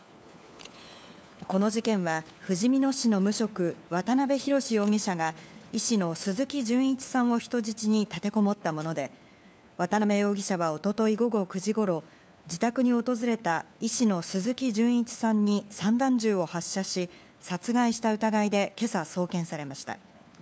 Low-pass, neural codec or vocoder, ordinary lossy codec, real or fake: none; codec, 16 kHz, 4 kbps, FunCodec, trained on LibriTTS, 50 frames a second; none; fake